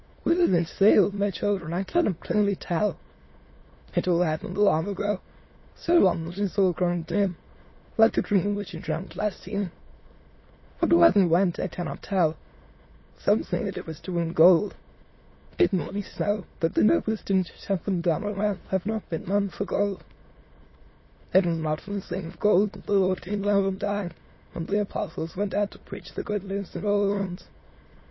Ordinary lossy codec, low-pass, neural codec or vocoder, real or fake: MP3, 24 kbps; 7.2 kHz; autoencoder, 22.05 kHz, a latent of 192 numbers a frame, VITS, trained on many speakers; fake